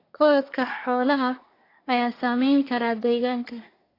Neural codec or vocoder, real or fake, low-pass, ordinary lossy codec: codec, 24 kHz, 1 kbps, SNAC; fake; 5.4 kHz; AAC, 32 kbps